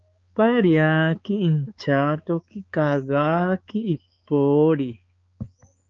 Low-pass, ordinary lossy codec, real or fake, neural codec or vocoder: 7.2 kHz; Opus, 24 kbps; fake; codec, 16 kHz, 4 kbps, X-Codec, HuBERT features, trained on balanced general audio